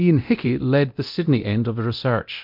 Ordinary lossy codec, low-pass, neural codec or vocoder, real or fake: MP3, 48 kbps; 5.4 kHz; codec, 24 kHz, 0.9 kbps, DualCodec; fake